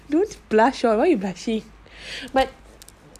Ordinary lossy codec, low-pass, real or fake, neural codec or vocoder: none; 14.4 kHz; real; none